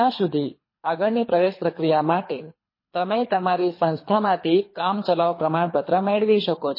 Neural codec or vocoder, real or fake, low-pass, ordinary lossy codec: codec, 24 kHz, 3 kbps, HILCodec; fake; 5.4 kHz; MP3, 24 kbps